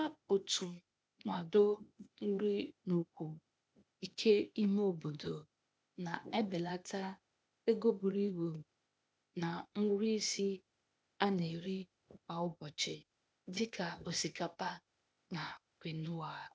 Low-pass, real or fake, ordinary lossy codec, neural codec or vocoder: none; fake; none; codec, 16 kHz, 0.8 kbps, ZipCodec